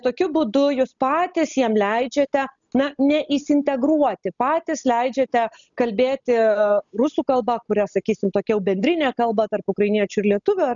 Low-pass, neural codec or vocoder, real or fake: 7.2 kHz; none; real